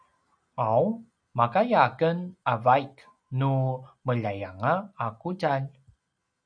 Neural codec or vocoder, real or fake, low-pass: none; real; 9.9 kHz